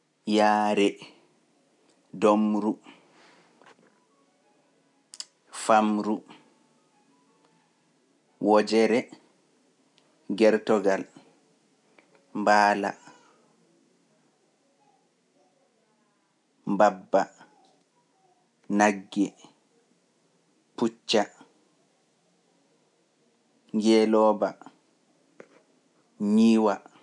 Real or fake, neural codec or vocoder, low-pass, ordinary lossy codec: real; none; 10.8 kHz; none